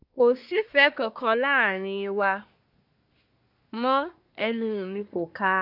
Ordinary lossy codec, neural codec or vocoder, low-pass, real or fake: none; codec, 24 kHz, 1 kbps, SNAC; 5.4 kHz; fake